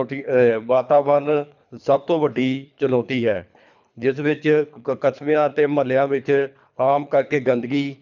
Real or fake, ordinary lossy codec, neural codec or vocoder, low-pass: fake; none; codec, 24 kHz, 3 kbps, HILCodec; 7.2 kHz